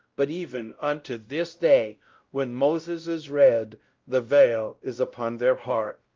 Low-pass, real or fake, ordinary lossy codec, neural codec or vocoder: 7.2 kHz; fake; Opus, 32 kbps; codec, 16 kHz, 0.5 kbps, X-Codec, WavLM features, trained on Multilingual LibriSpeech